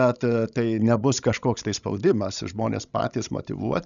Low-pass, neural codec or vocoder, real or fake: 7.2 kHz; codec, 16 kHz, 16 kbps, FreqCodec, larger model; fake